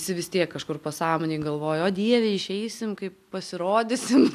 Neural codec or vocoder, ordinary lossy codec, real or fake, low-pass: none; MP3, 96 kbps; real; 14.4 kHz